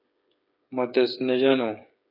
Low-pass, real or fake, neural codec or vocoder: 5.4 kHz; fake; codec, 16 kHz, 8 kbps, FreqCodec, smaller model